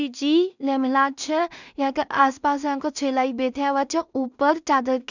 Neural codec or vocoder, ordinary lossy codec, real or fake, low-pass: codec, 16 kHz in and 24 kHz out, 0.4 kbps, LongCat-Audio-Codec, two codebook decoder; none; fake; 7.2 kHz